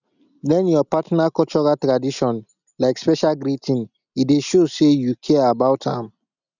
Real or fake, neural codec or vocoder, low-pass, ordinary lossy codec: real; none; 7.2 kHz; none